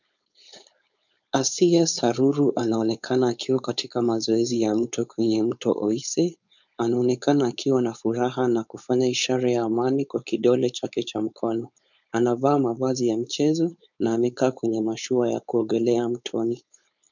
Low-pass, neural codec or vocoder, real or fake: 7.2 kHz; codec, 16 kHz, 4.8 kbps, FACodec; fake